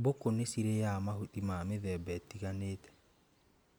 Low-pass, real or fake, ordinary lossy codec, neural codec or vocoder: none; real; none; none